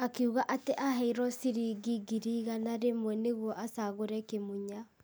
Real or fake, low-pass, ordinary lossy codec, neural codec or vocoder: real; none; none; none